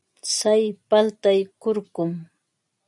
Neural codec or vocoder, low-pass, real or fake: none; 10.8 kHz; real